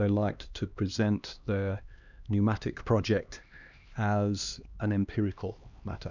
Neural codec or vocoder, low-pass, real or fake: codec, 16 kHz, 2 kbps, X-Codec, HuBERT features, trained on LibriSpeech; 7.2 kHz; fake